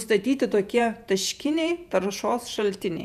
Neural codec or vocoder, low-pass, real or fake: vocoder, 48 kHz, 128 mel bands, Vocos; 14.4 kHz; fake